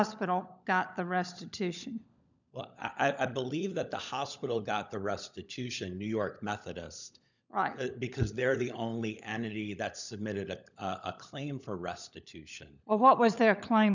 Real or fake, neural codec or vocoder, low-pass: fake; codec, 16 kHz, 16 kbps, FunCodec, trained on LibriTTS, 50 frames a second; 7.2 kHz